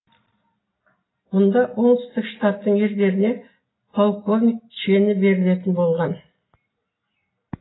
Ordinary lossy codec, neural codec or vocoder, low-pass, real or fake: AAC, 16 kbps; none; 7.2 kHz; real